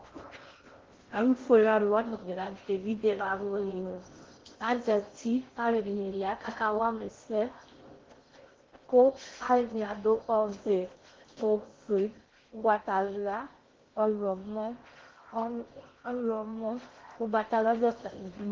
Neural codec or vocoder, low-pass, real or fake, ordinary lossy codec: codec, 16 kHz in and 24 kHz out, 0.6 kbps, FocalCodec, streaming, 4096 codes; 7.2 kHz; fake; Opus, 16 kbps